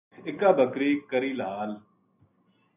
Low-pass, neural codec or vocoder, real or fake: 3.6 kHz; none; real